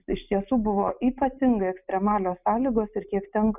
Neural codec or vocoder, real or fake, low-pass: none; real; 3.6 kHz